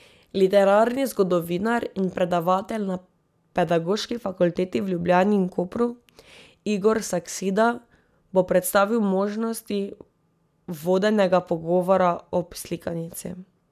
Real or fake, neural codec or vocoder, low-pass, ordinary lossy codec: real; none; 14.4 kHz; none